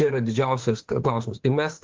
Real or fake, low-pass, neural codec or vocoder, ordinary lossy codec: fake; 7.2 kHz; autoencoder, 48 kHz, 32 numbers a frame, DAC-VAE, trained on Japanese speech; Opus, 16 kbps